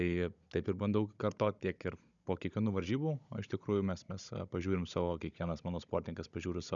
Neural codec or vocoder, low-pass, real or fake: codec, 16 kHz, 16 kbps, FunCodec, trained on Chinese and English, 50 frames a second; 7.2 kHz; fake